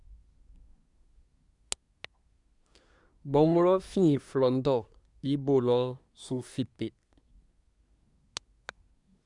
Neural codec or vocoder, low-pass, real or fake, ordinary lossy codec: codec, 24 kHz, 1 kbps, SNAC; 10.8 kHz; fake; none